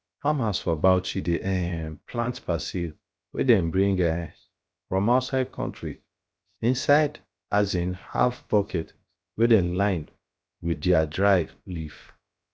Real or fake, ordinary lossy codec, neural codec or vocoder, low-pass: fake; none; codec, 16 kHz, 0.7 kbps, FocalCodec; none